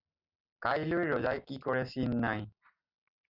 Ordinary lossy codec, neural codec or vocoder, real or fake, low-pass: Opus, 64 kbps; none; real; 5.4 kHz